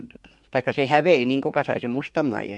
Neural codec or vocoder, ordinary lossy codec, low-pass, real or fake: codec, 24 kHz, 1 kbps, SNAC; MP3, 64 kbps; 10.8 kHz; fake